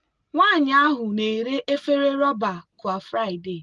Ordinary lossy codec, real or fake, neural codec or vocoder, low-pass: Opus, 24 kbps; real; none; 10.8 kHz